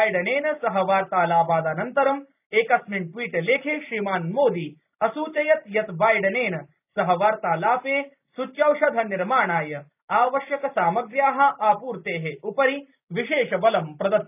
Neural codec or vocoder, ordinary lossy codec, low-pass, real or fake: none; none; 3.6 kHz; real